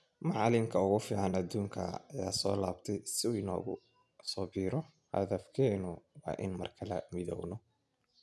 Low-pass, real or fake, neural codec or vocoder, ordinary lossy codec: none; real; none; none